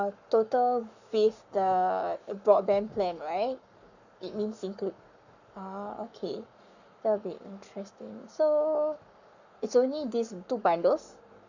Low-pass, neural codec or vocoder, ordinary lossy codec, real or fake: 7.2 kHz; codec, 44.1 kHz, 7.8 kbps, Pupu-Codec; none; fake